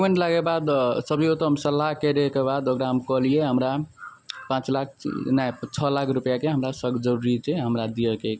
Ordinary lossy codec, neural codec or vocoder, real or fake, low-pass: none; none; real; none